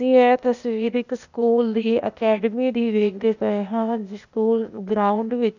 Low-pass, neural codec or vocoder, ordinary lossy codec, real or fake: 7.2 kHz; codec, 16 kHz, 0.8 kbps, ZipCodec; none; fake